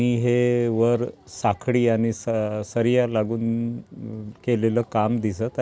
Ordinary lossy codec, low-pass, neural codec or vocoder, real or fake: none; none; none; real